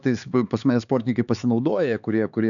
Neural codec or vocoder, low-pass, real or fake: codec, 16 kHz, 4 kbps, X-Codec, HuBERT features, trained on LibriSpeech; 7.2 kHz; fake